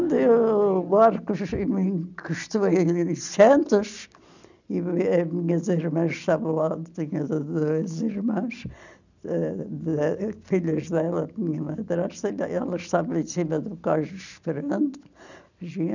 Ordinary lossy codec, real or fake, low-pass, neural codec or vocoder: none; real; 7.2 kHz; none